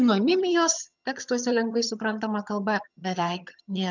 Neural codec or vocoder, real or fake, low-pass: vocoder, 22.05 kHz, 80 mel bands, HiFi-GAN; fake; 7.2 kHz